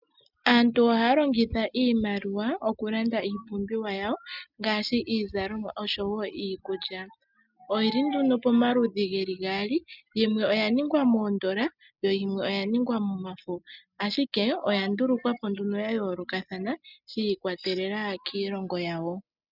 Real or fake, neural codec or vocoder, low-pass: real; none; 5.4 kHz